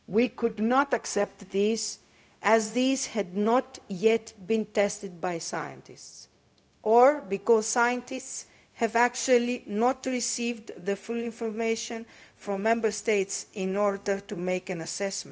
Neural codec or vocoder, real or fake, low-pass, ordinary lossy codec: codec, 16 kHz, 0.4 kbps, LongCat-Audio-Codec; fake; none; none